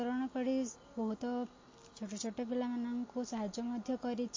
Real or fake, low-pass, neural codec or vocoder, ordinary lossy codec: real; 7.2 kHz; none; MP3, 32 kbps